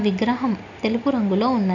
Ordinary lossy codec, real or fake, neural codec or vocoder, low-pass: none; real; none; 7.2 kHz